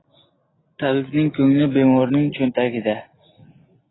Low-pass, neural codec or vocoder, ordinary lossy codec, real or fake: 7.2 kHz; none; AAC, 16 kbps; real